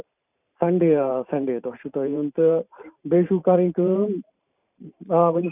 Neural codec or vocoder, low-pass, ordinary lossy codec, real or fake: vocoder, 44.1 kHz, 128 mel bands every 512 samples, BigVGAN v2; 3.6 kHz; none; fake